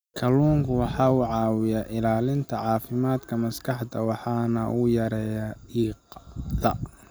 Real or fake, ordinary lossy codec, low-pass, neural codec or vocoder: real; none; none; none